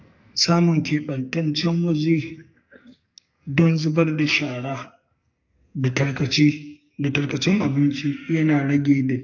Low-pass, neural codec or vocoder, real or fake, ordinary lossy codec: 7.2 kHz; codec, 44.1 kHz, 2.6 kbps, SNAC; fake; AAC, 48 kbps